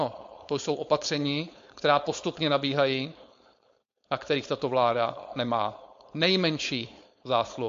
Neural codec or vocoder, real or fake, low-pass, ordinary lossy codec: codec, 16 kHz, 4.8 kbps, FACodec; fake; 7.2 kHz; MP3, 48 kbps